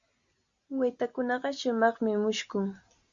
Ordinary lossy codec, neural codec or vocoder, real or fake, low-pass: Opus, 64 kbps; none; real; 7.2 kHz